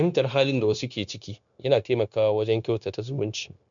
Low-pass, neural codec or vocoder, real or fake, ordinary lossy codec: 7.2 kHz; codec, 16 kHz, 0.9 kbps, LongCat-Audio-Codec; fake; none